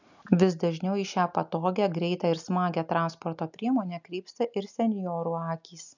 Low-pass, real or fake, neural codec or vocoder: 7.2 kHz; real; none